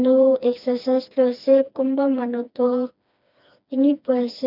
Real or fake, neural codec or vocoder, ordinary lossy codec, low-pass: fake; codec, 16 kHz, 2 kbps, FreqCodec, smaller model; none; 5.4 kHz